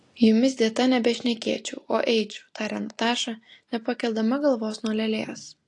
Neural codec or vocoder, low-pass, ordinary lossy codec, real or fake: none; 10.8 kHz; AAC, 48 kbps; real